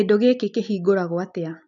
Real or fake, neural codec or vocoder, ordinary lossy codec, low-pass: real; none; none; 7.2 kHz